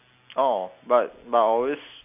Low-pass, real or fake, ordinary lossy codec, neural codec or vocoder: 3.6 kHz; real; AAC, 32 kbps; none